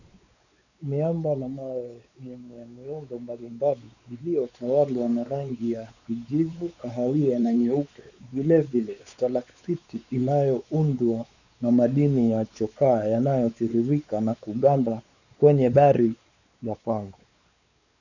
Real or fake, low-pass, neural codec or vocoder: fake; 7.2 kHz; codec, 16 kHz, 4 kbps, X-Codec, WavLM features, trained on Multilingual LibriSpeech